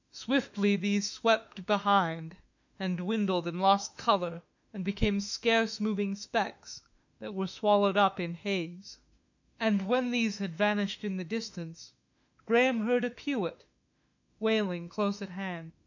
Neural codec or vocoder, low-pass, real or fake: autoencoder, 48 kHz, 32 numbers a frame, DAC-VAE, trained on Japanese speech; 7.2 kHz; fake